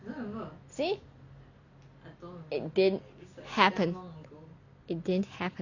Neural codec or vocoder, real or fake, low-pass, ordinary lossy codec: none; real; 7.2 kHz; none